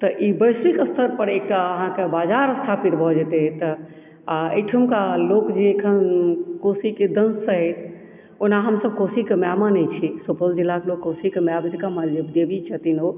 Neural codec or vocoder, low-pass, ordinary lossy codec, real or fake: none; 3.6 kHz; none; real